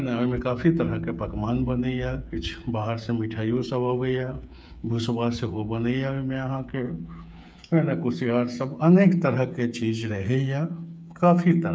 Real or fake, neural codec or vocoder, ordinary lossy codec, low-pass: fake; codec, 16 kHz, 8 kbps, FreqCodec, smaller model; none; none